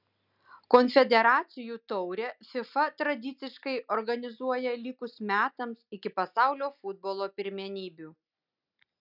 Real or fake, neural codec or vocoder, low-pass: real; none; 5.4 kHz